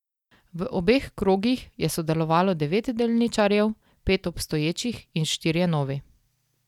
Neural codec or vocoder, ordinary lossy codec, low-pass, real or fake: none; none; 19.8 kHz; real